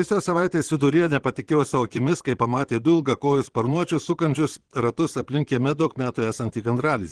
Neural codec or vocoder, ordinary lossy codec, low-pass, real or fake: vocoder, 22.05 kHz, 80 mel bands, Vocos; Opus, 16 kbps; 9.9 kHz; fake